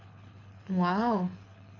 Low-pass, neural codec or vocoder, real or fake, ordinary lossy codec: 7.2 kHz; codec, 24 kHz, 6 kbps, HILCodec; fake; none